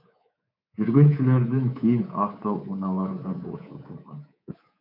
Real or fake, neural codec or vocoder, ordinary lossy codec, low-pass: fake; codec, 24 kHz, 3.1 kbps, DualCodec; MP3, 48 kbps; 5.4 kHz